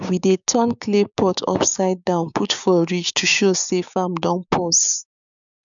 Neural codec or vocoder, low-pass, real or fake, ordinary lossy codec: codec, 16 kHz, 6 kbps, DAC; 7.2 kHz; fake; none